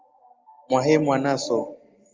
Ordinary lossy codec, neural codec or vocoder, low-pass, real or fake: Opus, 32 kbps; none; 7.2 kHz; real